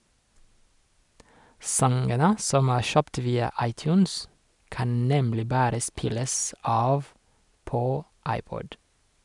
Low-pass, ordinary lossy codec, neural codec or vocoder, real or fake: 10.8 kHz; none; none; real